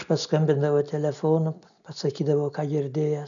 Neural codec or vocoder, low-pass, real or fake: none; 7.2 kHz; real